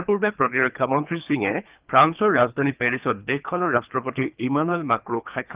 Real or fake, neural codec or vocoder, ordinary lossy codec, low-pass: fake; codec, 24 kHz, 3 kbps, HILCodec; Opus, 32 kbps; 3.6 kHz